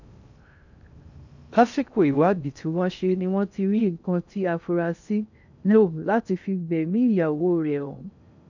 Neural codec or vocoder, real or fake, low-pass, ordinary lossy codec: codec, 16 kHz in and 24 kHz out, 0.6 kbps, FocalCodec, streaming, 2048 codes; fake; 7.2 kHz; none